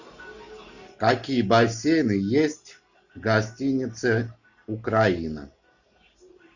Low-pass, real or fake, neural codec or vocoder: 7.2 kHz; real; none